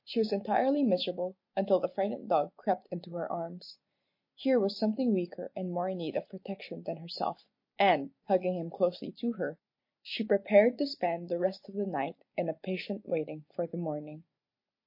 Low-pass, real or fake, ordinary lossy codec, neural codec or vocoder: 5.4 kHz; real; MP3, 32 kbps; none